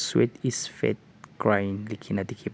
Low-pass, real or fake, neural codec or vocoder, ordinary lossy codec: none; real; none; none